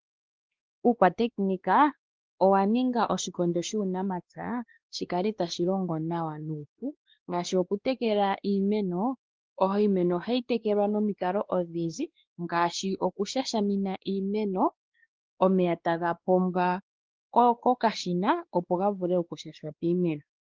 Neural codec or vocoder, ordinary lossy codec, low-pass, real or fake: codec, 16 kHz, 2 kbps, X-Codec, WavLM features, trained on Multilingual LibriSpeech; Opus, 16 kbps; 7.2 kHz; fake